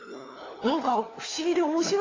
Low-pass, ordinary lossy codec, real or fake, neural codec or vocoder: 7.2 kHz; AAC, 32 kbps; fake; codec, 16 kHz, 4 kbps, FunCodec, trained on LibriTTS, 50 frames a second